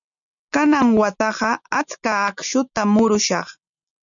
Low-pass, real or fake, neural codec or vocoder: 7.2 kHz; real; none